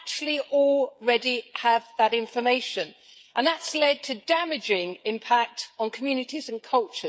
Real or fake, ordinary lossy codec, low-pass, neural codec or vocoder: fake; none; none; codec, 16 kHz, 8 kbps, FreqCodec, smaller model